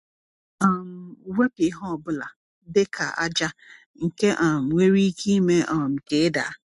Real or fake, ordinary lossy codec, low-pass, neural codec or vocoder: real; MP3, 64 kbps; 10.8 kHz; none